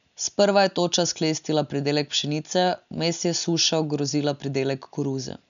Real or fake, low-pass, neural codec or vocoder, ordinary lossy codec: real; 7.2 kHz; none; none